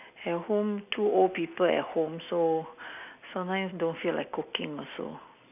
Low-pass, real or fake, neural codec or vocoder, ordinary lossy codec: 3.6 kHz; real; none; none